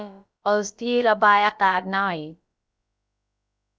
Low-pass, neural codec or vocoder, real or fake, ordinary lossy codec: none; codec, 16 kHz, about 1 kbps, DyCAST, with the encoder's durations; fake; none